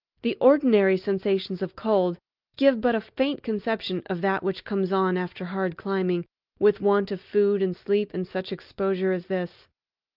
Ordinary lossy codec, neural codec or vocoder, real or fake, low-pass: Opus, 32 kbps; none; real; 5.4 kHz